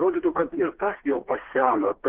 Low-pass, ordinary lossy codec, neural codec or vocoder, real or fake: 3.6 kHz; Opus, 16 kbps; codec, 16 kHz, 2 kbps, FreqCodec, smaller model; fake